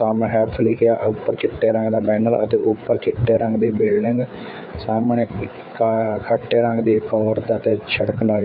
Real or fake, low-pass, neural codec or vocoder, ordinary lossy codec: fake; 5.4 kHz; codec, 16 kHz, 4 kbps, FreqCodec, larger model; none